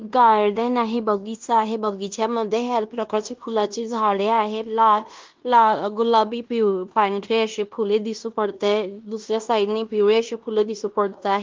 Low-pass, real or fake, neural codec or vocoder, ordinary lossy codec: 7.2 kHz; fake; codec, 16 kHz in and 24 kHz out, 0.9 kbps, LongCat-Audio-Codec, fine tuned four codebook decoder; Opus, 32 kbps